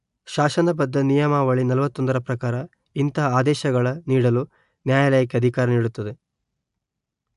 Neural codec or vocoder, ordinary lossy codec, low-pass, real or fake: none; AAC, 96 kbps; 10.8 kHz; real